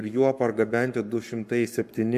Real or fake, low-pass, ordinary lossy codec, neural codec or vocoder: fake; 14.4 kHz; MP3, 96 kbps; codec, 44.1 kHz, 7.8 kbps, Pupu-Codec